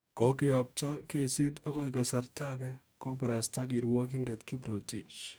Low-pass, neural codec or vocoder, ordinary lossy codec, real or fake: none; codec, 44.1 kHz, 2.6 kbps, DAC; none; fake